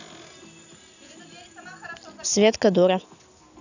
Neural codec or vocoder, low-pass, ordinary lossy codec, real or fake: none; 7.2 kHz; none; real